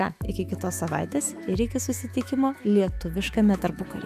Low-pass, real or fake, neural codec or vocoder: 14.4 kHz; fake; autoencoder, 48 kHz, 128 numbers a frame, DAC-VAE, trained on Japanese speech